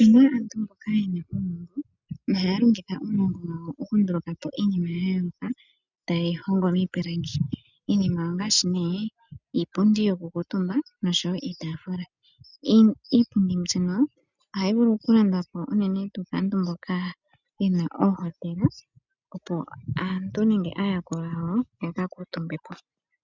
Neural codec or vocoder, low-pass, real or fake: none; 7.2 kHz; real